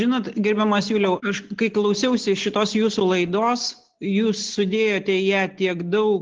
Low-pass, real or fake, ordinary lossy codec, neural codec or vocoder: 7.2 kHz; real; Opus, 16 kbps; none